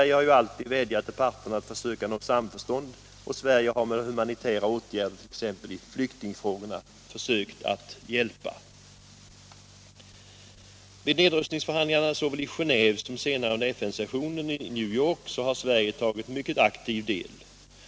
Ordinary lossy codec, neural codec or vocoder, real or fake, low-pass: none; none; real; none